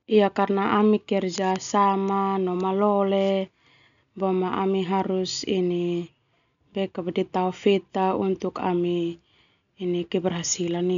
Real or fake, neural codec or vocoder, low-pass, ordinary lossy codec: real; none; 7.2 kHz; none